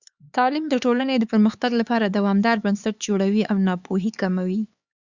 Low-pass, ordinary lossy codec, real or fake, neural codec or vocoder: 7.2 kHz; Opus, 64 kbps; fake; codec, 16 kHz, 4 kbps, X-Codec, HuBERT features, trained on LibriSpeech